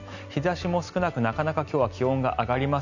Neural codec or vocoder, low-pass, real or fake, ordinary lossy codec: none; 7.2 kHz; real; none